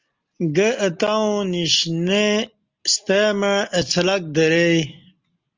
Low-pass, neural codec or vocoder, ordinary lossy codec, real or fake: 7.2 kHz; none; Opus, 32 kbps; real